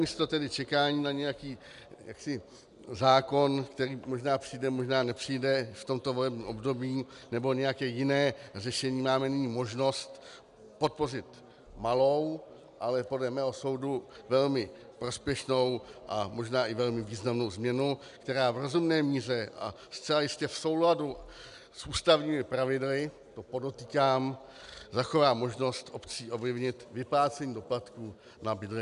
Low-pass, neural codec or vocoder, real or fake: 10.8 kHz; none; real